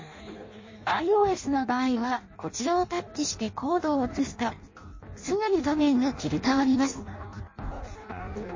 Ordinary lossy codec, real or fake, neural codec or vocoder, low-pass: MP3, 32 kbps; fake; codec, 16 kHz in and 24 kHz out, 0.6 kbps, FireRedTTS-2 codec; 7.2 kHz